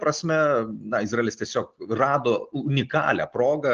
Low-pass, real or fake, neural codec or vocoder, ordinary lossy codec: 7.2 kHz; real; none; Opus, 16 kbps